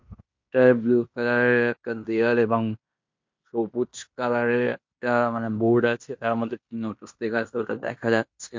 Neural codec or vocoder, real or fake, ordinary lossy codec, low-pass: codec, 16 kHz in and 24 kHz out, 0.9 kbps, LongCat-Audio-Codec, fine tuned four codebook decoder; fake; MP3, 48 kbps; 7.2 kHz